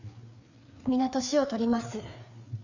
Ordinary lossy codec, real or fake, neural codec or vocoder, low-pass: none; fake; codec, 16 kHz, 4 kbps, FreqCodec, larger model; 7.2 kHz